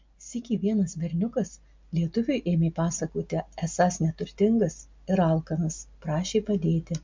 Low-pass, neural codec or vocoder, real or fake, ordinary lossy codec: 7.2 kHz; none; real; MP3, 64 kbps